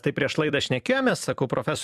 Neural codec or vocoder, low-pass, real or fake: vocoder, 44.1 kHz, 128 mel bands every 256 samples, BigVGAN v2; 14.4 kHz; fake